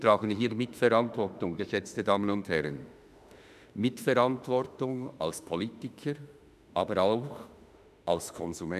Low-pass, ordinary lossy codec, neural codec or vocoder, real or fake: 14.4 kHz; none; autoencoder, 48 kHz, 32 numbers a frame, DAC-VAE, trained on Japanese speech; fake